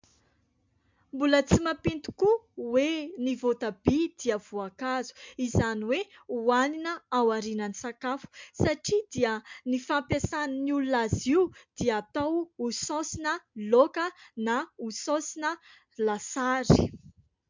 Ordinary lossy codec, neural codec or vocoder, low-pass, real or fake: MP3, 64 kbps; none; 7.2 kHz; real